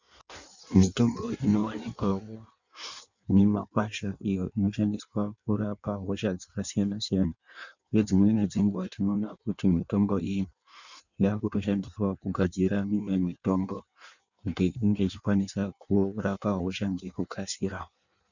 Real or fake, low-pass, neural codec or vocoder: fake; 7.2 kHz; codec, 16 kHz in and 24 kHz out, 1.1 kbps, FireRedTTS-2 codec